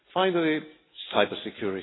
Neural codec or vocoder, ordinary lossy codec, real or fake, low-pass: none; AAC, 16 kbps; real; 7.2 kHz